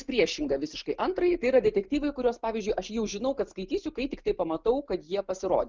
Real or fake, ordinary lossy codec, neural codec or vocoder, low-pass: real; Opus, 24 kbps; none; 7.2 kHz